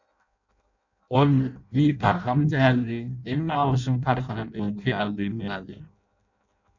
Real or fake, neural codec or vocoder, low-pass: fake; codec, 16 kHz in and 24 kHz out, 0.6 kbps, FireRedTTS-2 codec; 7.2 kHz